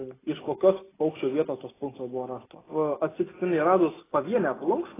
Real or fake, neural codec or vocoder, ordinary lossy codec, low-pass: real; none; AAC, 16 kbps; 3.6 kHz